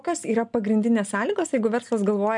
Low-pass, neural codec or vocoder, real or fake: 10.8 kHz; none; real